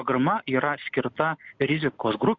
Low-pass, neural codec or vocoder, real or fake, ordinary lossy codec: 7.2 kHz; none; real; MP3, 64 kbps